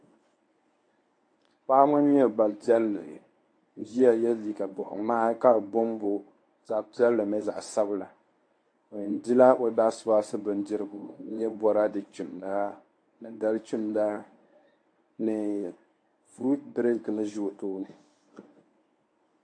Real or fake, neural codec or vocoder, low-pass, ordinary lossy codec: fake; codec, 24 kHz, 0.9 kbps, WavTokenizer, medium speech release version 1; 9.9 kHz; AAC, 48 kbps